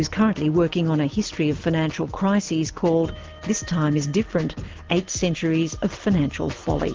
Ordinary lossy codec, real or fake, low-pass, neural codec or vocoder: Opus, 16 kbps; real; 7.2 kHz; none